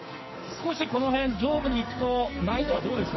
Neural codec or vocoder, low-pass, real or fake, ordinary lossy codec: codec, 44.1 kHz, 2.6 kbps, SNAC; 7.2 kHz; fake; MP3, 24 kbps